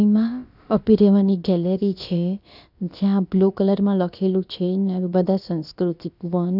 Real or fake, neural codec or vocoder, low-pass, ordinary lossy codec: fake; codec, 16 kHz, about 1 kbps, DyCAST, with the encoder's durations; 5.4 kHz; none